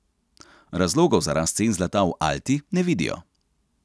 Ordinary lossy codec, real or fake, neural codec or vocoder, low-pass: none; real; none; none